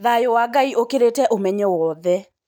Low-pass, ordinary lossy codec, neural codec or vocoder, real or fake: 19.8 kHz; none; none; real